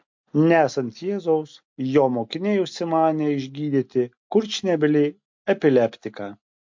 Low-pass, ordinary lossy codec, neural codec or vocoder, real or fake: 7.2 kHz; MP3, 48 kbps; none; real